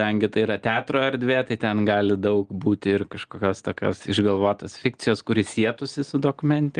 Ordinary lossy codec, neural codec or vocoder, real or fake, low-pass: Opus, 24 kbps; none; real; 9.9 kHz